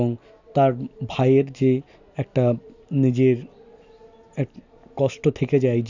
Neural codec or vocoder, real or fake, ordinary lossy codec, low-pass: none; real; none; 7.2 kHz